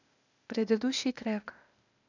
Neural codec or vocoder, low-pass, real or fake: codec, 16 kHz, 0.8 kbps, ZipCodec; 7.2 kHz; fake